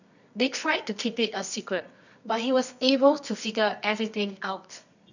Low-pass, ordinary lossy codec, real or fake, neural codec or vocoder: 7.2 kHz; none; fake; codec, 24 kHz, 0.9 kbps, WavTokenizer, medium music audio release